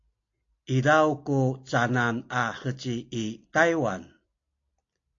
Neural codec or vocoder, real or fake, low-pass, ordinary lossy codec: none; real; 7.2 kHz; AAC, 48 kbps